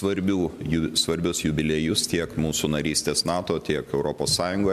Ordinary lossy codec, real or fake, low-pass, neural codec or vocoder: MP3, 96 kbps; real; 14.4 kHz; none